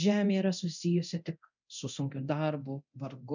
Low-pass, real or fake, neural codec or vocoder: 7.2 kHz; fake; codec, 24 kHz, 0.9 kbps, DualCodec